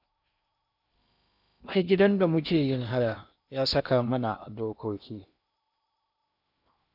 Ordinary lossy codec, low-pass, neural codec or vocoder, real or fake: none; 5.4 kHz; codec, 16 kHz in and 24 kHz out, 0.8 kbps, FocalCodec, streaming, 65536 codes; fake